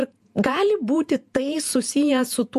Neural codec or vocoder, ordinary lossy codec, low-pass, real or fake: vocoder, 48 kHz, 128 mel bands, Vocos; MP3, 64 kbps; 14.4 kHz; fake